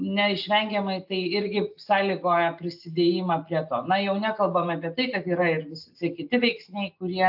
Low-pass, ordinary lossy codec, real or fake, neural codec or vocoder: 5.4 kHz; AAC, 48 kbps; real; none